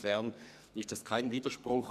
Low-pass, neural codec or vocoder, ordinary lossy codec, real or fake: 14.4 kHz; codec, 44.1 kHz, 2.6 kbps, SNAC; none; fake